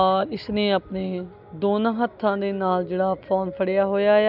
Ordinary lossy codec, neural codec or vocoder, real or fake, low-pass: none; none; real; 5.4 kHz